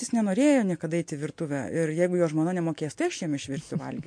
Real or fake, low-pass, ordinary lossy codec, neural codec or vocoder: real; 9.9 kHz; MP3, 48 kbps; none